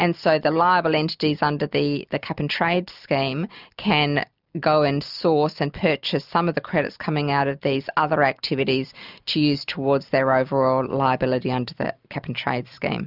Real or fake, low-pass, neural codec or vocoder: real; 5.4 kHz; none